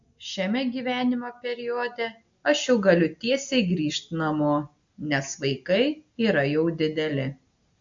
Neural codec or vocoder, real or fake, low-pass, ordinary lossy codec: none; real; 7.2 kHz; AAC, 64 kbps